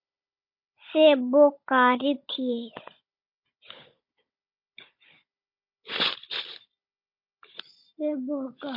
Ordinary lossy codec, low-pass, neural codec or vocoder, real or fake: MP3, 32 kbps; 5.4 kHz; codec, 16 kHz, 16 kbps, FunCodec, trained on Chinese and English, 50 frames a second; fake